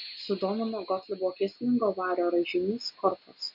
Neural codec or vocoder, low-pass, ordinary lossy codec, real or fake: none; 5.4 kHz; MP3, 48 kbps; real